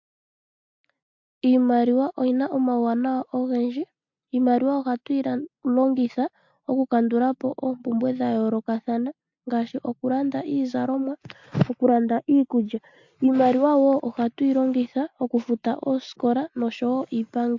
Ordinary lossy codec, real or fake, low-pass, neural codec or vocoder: MP3, 48 kbps; real; 7.2 kHz; none